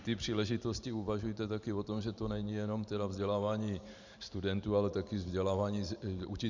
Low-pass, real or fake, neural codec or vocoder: 7.2 kHz; fake; vocoder, 44.1 kHz, 128 mel bands every 512 samples, BigVGAN v2